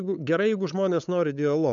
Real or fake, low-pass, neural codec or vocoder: fake; 7.2 kHz; codec, 16 kHz, 8 kbps, FunCodec, trained on LibriTTS, 25 frames a second